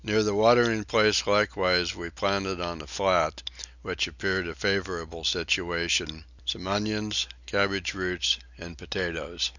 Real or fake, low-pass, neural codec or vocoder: real; 7.2 kHz; none